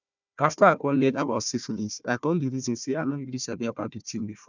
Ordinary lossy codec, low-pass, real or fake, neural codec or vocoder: none; 7.2 kHz; fake; codec, 16 kHz, 1 kbps, FunCodec, trained on Chinese and English, 50 frames a second